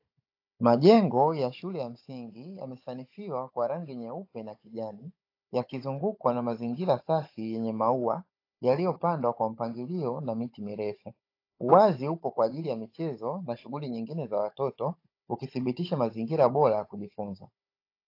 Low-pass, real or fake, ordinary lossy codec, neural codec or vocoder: 5.4 kHz; fake; AAC, 32 kbps; codec, 16 kHz, 16 kbps, FunCodec, trained on Chinese and English, 50 frames a second